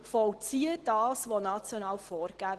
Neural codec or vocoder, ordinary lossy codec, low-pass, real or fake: none; Opus, 16 kbps; 10.8 kHz; real